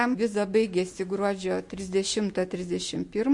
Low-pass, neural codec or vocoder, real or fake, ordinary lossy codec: 10.8 kHz; none; real; MP3, 48 kbps